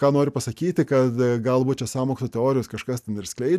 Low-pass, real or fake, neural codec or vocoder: 14.4 kHz; real; none